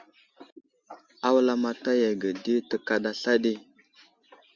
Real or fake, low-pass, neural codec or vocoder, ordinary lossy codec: real; 7.2 kHz; none; Opus, 64 kbps